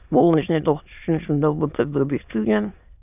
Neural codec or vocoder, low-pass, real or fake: autoencoder, 22.05 kHz, a latent of 192 numbers a frame, VITS, trained on many speakers; 3.6 kHz; fake